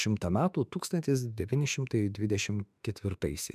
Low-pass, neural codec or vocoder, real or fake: 14.4 kHz; autoencoder, 48 kHz, 32 numbers a frame, DAC-VAE, trained on Japanese speech; fake